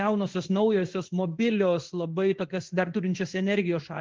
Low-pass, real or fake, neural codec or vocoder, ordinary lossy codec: 7.2 kHz; fake; codec, 16 kHz in and 24 kHz out, 1 kbps, XY-Tokenizer; Opus, 16 kbps